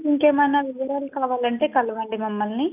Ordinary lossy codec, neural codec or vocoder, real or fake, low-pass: none; none; real; 3.6 kHz